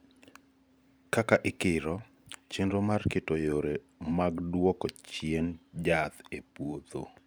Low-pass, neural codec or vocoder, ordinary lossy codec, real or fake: none; vocoder, 44.1 kHz, 128 mel bands every 512 samples, BigVGAN v2; none; fake